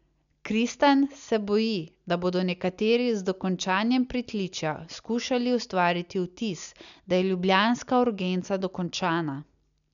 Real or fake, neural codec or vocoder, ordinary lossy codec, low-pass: real; none; MP3, 96 kbps; 7.2 kHz